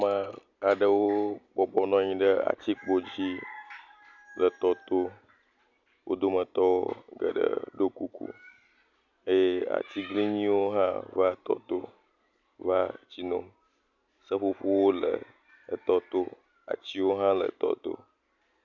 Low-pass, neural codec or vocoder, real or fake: 7.2 kHz; none; real